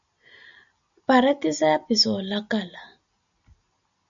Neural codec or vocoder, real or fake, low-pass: none; real; 7.2 kHz